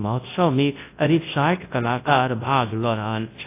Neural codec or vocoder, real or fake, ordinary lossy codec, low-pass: codec, 24 kHz, 0.9 kbps, WavTokenizer, large speech release; fake; AAC, 24 kbps; 3.6 kHz